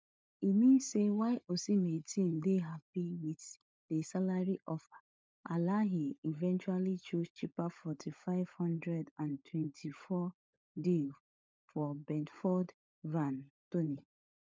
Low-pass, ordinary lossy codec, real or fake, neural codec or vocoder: none; none; fake; codec, 16 kHz, 16 kbps, FunCodec, trained on LibriTTS, 50 frames a second